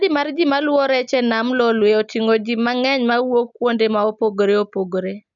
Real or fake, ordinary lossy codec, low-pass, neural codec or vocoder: real; none; 7.2 kHz; none